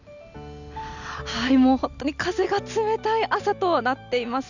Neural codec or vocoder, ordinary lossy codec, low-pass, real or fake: none; none; 7.2 kHz; real